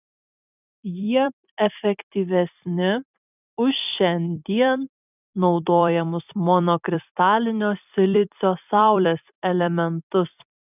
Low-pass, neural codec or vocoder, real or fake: 3.6 kHz; vocoder, 44.1 kHz, 128 mel bands every 256 samples, BigVGAN v2; fake